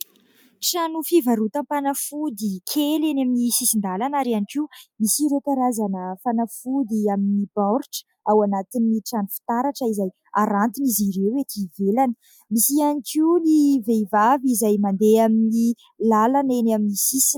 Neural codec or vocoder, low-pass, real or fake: none; 19.8 kHz; real